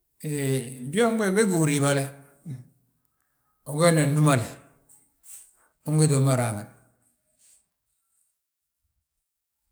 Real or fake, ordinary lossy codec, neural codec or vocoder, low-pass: fake; none; codec, 44.1 kHz, 7.8 kbps, DAC; none